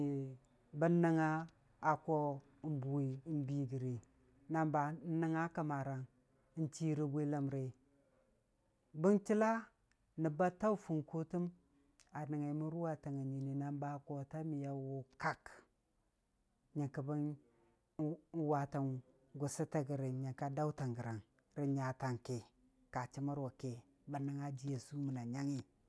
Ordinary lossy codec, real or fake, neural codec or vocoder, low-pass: none; real; none; none